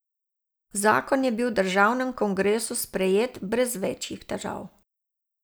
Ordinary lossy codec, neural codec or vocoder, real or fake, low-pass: none; none; real; none